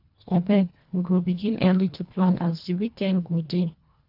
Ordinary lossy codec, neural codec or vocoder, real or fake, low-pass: none; codec, 24 kHz, 1.5 kbps, HILCodec; fake; 5.4 kHz